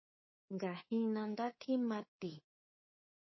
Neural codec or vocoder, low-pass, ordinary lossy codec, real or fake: codec, 24 kHz, 3.1 kbps, DualCodec; 7.2 kHz; MP3, 24 kbps; fake